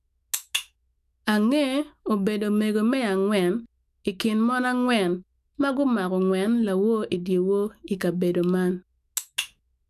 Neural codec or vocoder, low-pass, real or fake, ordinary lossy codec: autoencoder, 48 kHz, 128 numbers a frame, DAC-VAE, trained on Japanese speech; 14.4 kHz; fake; none